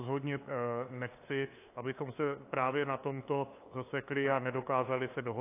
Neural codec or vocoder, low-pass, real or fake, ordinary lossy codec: codec, 16 kHz, 2 kbps, FunCodec, trained on LibriTTS, 25 frames a second; 3.6 kHz; fake; AAC, 24 kbps